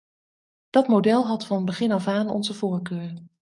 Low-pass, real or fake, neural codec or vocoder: 10.8 kHz; fake; codec, 44.1 kHz, 7.8 kbps, DAC